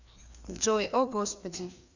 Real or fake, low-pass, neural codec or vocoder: fake; 7.2 kHz; codec, 16 kHz, 2 kbps, FreqCodec, larger model